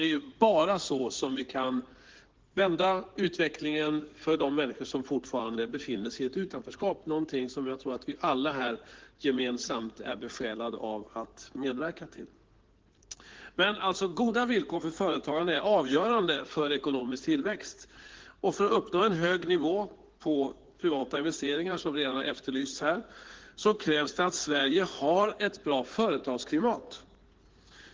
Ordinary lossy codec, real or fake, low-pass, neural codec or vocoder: Opus, 16 kbps; fake; 7.2 kHz; codec, 16 kHz in and 24 kHz out, 2.2 kbps, FireRedTTS-2 codec